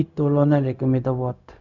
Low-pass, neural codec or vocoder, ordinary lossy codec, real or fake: 7.2 kHz; codec, 16 kHz, 0.4 kbps, LongCat-Audio-Codec; none; fake